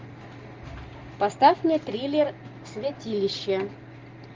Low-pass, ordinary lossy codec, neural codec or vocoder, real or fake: 7.2 kHz; Opus, 32 kbps; none; real